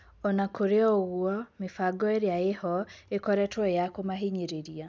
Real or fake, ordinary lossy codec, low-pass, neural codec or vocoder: real; none; none; none